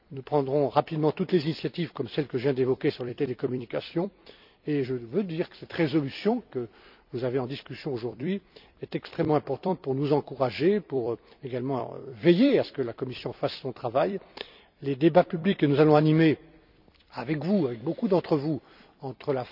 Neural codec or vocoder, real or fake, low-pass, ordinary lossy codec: vocoder, 44.1 kHz, 128 mel bands every 256 samples, BigVGAN v2; fake; 5.4 kHz; none